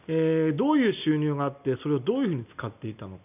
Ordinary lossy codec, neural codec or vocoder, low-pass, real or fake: none; none; 3.6 kHz; real